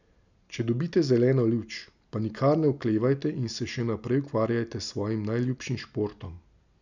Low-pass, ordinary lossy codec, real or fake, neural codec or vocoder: 7.2 kHz; none; real; none